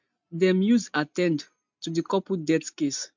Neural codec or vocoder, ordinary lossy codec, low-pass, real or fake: none; MP3, 64 kbps; 7.2 kHz; real